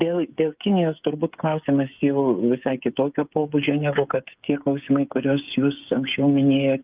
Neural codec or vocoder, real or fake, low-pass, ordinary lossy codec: codec, 16 kHz, 8 kbps, FreqCodec, smaller model; fake; 3.6 kHz; Opus, 32 kbps